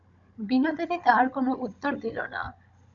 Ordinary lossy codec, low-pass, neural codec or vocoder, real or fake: MP3, 64 kbps; 7.2 kHz; codec, 16 kHz, 16 kbps, FunCodec, trained on Chinese and English, 50 frames a second; fake